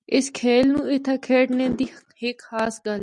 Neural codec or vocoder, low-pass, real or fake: none; 10.8 kHz; real